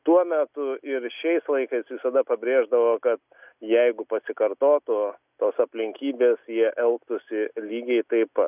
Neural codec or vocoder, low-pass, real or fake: none; 3.6 kHz; real